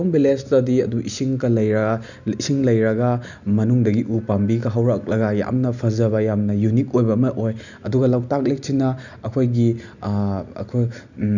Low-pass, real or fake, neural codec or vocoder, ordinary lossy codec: 7.2 kHz; real; none; none